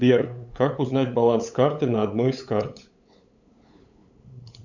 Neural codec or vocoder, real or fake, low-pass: codec, 16 kHz, 8 kbps, FunCodec, trained on LibriTTS, 25 frames a second; fake; 7.2 kHz